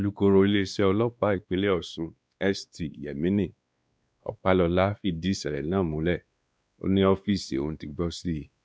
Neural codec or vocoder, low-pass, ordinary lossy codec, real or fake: codec, 16 kHz, 2 kbps, X-Codec, WavLM features, trained on Multilingual LibriSpeech; none; none; fake